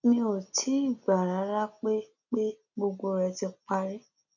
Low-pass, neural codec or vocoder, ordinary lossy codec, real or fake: 7.2 kHz; none; none; real